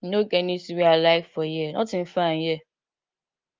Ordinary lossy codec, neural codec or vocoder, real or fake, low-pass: Opus, 32 kbps; none; real; 7.2 kHz